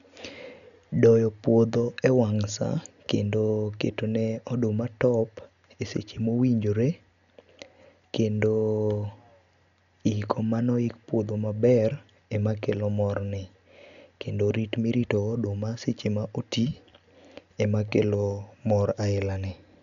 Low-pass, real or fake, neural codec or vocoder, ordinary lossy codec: 7.2 kHz; real; none; none